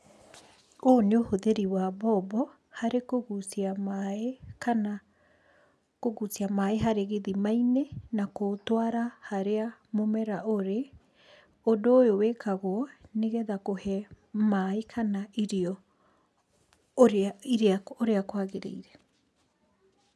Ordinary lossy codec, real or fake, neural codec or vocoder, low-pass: none; real; none; none